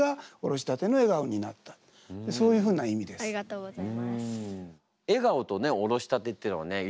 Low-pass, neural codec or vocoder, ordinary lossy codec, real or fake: none; none; none; real